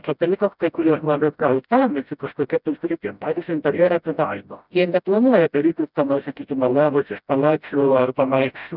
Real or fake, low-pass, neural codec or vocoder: fake; 5.4 kHz; codec, 16 kHz, 0.5 kbps, FreqCodec, smaller model